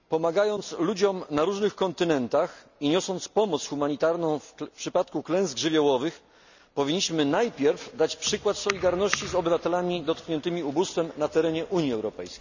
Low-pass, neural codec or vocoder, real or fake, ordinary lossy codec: 7.2 kHz; none; real; none